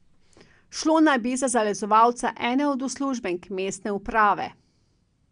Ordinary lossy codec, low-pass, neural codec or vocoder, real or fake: MP3, 96 kbps; 9.9 kHz; none; real